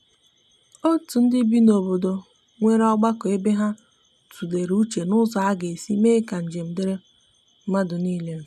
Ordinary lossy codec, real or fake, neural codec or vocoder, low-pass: none; real; none; 14.4 kHz